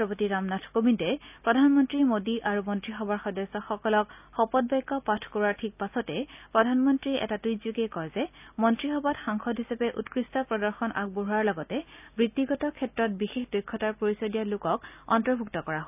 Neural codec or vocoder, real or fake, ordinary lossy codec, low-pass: none; real; none; 3.6 kHz